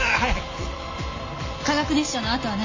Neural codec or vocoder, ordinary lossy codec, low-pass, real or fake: none; MP3, 32 kbps; 7.2 kHz; real